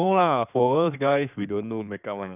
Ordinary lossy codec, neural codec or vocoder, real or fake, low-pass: none; codec, 16 kHz in and 24 kHz out, 2.2 kbps, FireRedTTS-2 codec; fake; 3.6 kHz